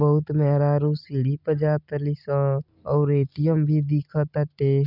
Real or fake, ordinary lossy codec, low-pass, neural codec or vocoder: real; none; 5.4 kHz; none